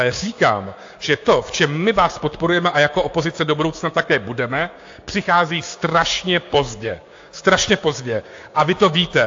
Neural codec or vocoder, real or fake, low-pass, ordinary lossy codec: codec, 16 kHz, 6 kbps, DAC; fake; 7.2 kHz; AAC, 48 kbps